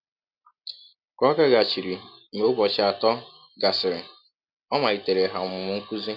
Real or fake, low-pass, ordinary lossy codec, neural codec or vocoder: real; 5.4 kHz; MP3, 48 kbps; none